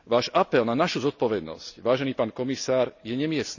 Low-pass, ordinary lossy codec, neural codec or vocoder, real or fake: 7.2 kHz; none; none; real